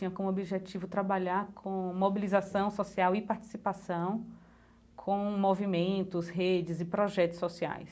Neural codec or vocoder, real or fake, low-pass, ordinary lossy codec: none; real; none; none